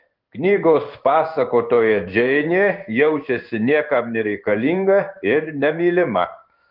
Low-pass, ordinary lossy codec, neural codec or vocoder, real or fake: 5.4 kHz; Opus, 24 kbps; codec, 16 kHz in and 24 kHz out, 1 kbps, XY-Tokenizer; fake